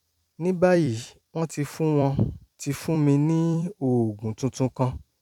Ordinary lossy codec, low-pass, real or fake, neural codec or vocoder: none; 19.8 kHz; fake; vocoder, 44.1 kHz, 128 mel bands every 256 samples, BigVGAN v2